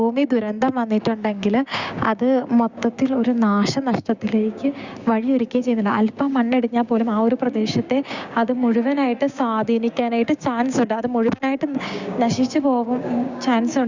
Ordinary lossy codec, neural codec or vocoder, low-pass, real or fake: Opus, 64 kbps; codec, 16 kHz, 6 kbps, DAC; 7.2 kHz; fake